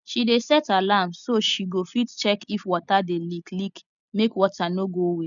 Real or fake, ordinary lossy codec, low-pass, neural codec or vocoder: real; none; 7.2 kHz; none